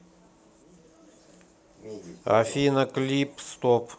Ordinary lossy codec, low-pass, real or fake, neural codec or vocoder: none; none; real; none